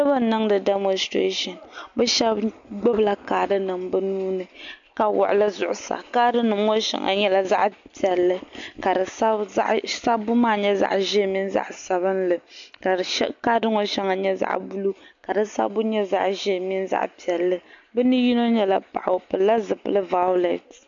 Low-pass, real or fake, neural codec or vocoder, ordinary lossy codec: 7.2 kHz; real; none; MP3, 64 kbps